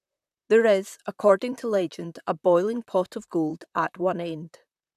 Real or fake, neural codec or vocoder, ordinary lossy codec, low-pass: fake; vocoder, 44.1 kHz, 128 mel bands, Pupu-Vocoder; none; 14.4 kHz